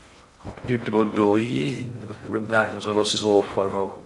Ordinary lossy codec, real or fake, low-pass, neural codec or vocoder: AAC, 64 kbps; fake; 10.8 kHz; codec, 16 kHz in and 24 kHz out, 0.6 kbps, FocalCodec, streaming, 2048 codes